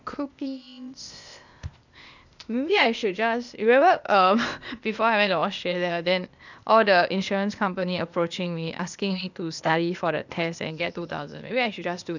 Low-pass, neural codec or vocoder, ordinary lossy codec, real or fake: 7.2 kHz; codec, 16 kHz, 0.8 kbps, ZipCodec; none; fake